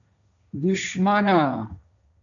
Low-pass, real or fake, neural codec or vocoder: 7.2 kHz; fake; codec, 16 kHz, 1.1 kbps, Voila-Tokenizer